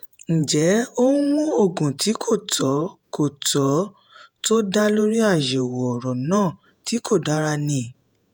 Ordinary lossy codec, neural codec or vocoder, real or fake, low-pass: none; vocoder, 48 kHz, 128 mel bands, Vocos; fake; none